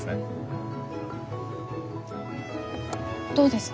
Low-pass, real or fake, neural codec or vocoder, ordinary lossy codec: none; real; none; none